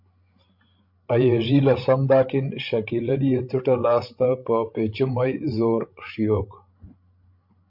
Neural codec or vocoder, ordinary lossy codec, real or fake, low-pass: codec, 16 kHz, 16 kbps, FreqCodec, larger model; MP3, 48 kbps; fake; 5.4 kHz